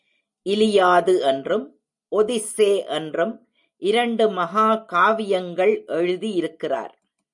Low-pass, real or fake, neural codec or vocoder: 10.8 kHz; real; none